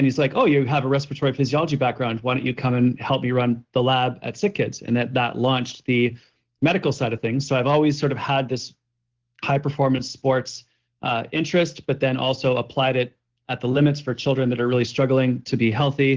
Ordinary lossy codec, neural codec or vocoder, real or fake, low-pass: Opus, 16 kbps; none; real; 7.2 kHz